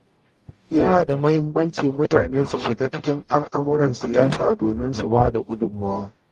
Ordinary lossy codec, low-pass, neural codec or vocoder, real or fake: Opus, 32 kbps; 14.4 kHz; codec, 44.1 kHz, 0.9 kbps, DAC; fake